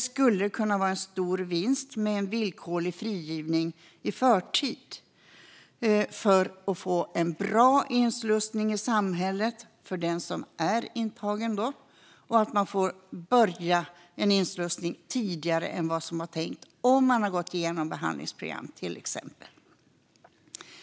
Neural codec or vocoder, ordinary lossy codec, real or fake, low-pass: none; none; real; none